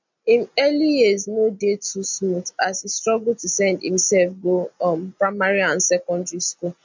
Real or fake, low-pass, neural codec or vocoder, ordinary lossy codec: real; 7.2 kHz; none; MP3, 64 kbps